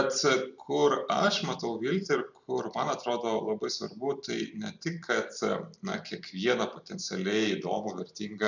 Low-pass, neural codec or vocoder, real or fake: 7.2 kHz; vocoder, 44.1 kHz, 128 mel bands every 256 samples, BigVGAN v2; fake